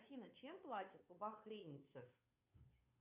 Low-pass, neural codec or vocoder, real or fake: 3.6 kHz; codec, 16 kHz, 2 kbps, FunCodec, trained on Chinese and English, 25 frames a second; fake